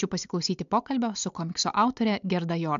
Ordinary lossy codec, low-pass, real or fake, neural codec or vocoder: MP3, 64 kbps; 7.2 kHz; real; none